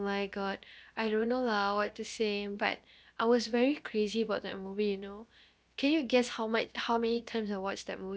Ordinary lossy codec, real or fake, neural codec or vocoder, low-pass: none; fake; codec, 16 kHz, about 1 kbps, DyCAST, with the encoder's durations; none